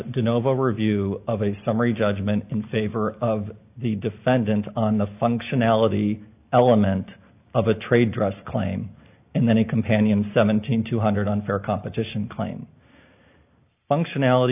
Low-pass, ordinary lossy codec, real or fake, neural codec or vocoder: 3.6 kHz; AAC, 32 kbps; real; none